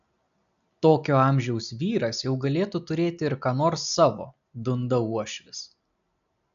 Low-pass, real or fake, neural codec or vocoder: 7.2 kHz; real; none